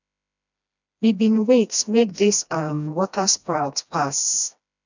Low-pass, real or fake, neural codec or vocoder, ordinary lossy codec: 7.2 kHz; fake; codec, 16 kHz, 1 kbps, FreqCodec, smaller model; none